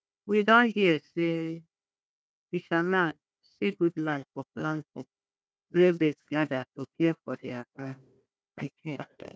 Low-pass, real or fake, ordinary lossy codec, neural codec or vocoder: none; fake; none; codec, 16 kHz, 1 kbps, FunCodec, trained on Chinese and English, 50 frames a second